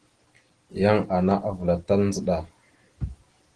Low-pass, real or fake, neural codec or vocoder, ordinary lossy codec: 9.9 kHz; real; none; Opus, 16 kbps